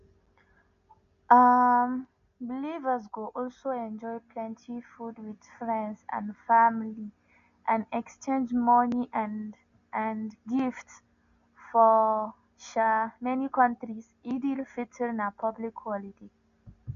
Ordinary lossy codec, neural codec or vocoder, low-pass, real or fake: none; none; 7.2 kHz; real